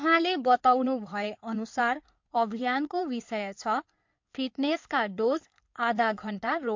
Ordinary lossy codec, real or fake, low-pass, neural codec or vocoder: MP3, 48 kbps; fake; 7.2 kHz; codec, 16 kHz in and 24 kHz out, 2.2 kbps, FireRedTTS-2 codec